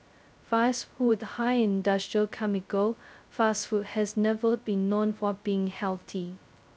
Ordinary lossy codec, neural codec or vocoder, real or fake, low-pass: none; codec, 16 kHz, 0.2 kbps, FocalCodec; fake; none